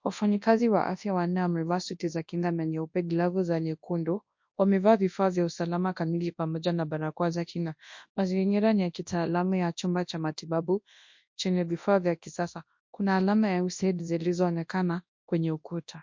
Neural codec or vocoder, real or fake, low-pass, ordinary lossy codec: codec, 24 kHz, 0.9 kbps, WavTokenizer, large speech release; fake; 7.2 kHz; MP3, 48 kbps